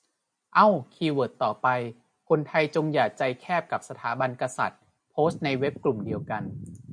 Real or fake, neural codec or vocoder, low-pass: real; none; 9.9 kHz